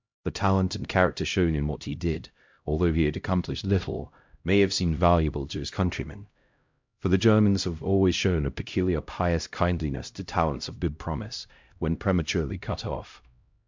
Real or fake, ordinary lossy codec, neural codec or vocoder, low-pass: fake; MP3, 64 kbps; codec, 16 kHz, 0.5 kbps, X-Codec, HuBERT features, trained on LibriSpeech; 7.2 kHz